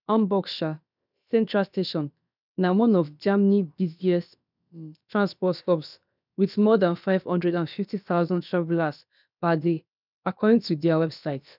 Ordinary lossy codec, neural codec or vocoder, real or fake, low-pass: none; codec, 16 kHz, about 1 kbps, DyCAST, with the encoder's durations; fake; 5.4 kHz